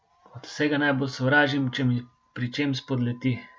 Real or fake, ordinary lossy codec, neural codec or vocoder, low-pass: real; none; none; none